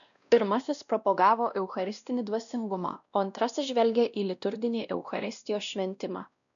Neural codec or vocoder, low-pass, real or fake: codec, 16 kHz, 1 kbps, X-Codec, WavLM features, trained on Multilingual LibriSpeech; 7.2 kHz; fake